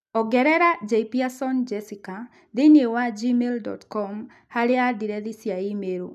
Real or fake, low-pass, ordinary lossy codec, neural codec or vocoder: real; 14.4 kHz; none; none